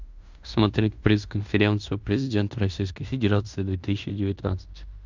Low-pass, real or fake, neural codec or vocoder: 7.2 kHz; fake; codec, 16 kHz in and 24 kHz out, 0.9 kbps, LongCat-Audio-Codec, fine tuned four codebook decoder